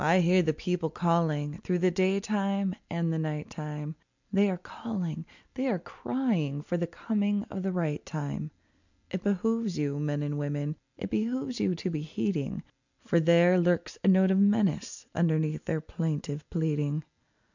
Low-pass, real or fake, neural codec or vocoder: 7.2 kHz; real; none